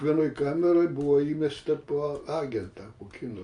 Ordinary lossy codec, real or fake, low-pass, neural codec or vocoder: MP3, 48 kbps; real; 9.9 kHz; none